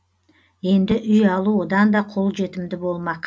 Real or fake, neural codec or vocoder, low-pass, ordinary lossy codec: real; none; none; none